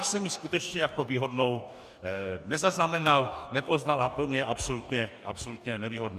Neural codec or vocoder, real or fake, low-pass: codec, 44.1 kHz, 2.6 kbps, DAC; fake; 14.4 kHz